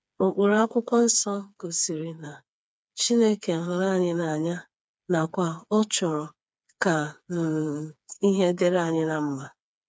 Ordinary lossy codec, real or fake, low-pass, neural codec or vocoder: none; fake; none; codec, 16 kHz, 4 kbps, FreqCodec, smaller model